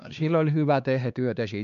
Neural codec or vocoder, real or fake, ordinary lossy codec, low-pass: codec, 16 kHz, 2 kbps, X-Codec, HuBERT features, trained on LibriSpeech; fake; none; 7.2 kHz